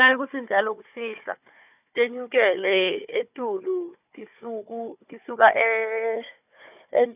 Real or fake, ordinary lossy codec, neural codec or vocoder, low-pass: fake; none; codec, 16 kHz, 4 kbps, FunCodec, trained on Chinese and English, 50 frames a second; 3.6 kHz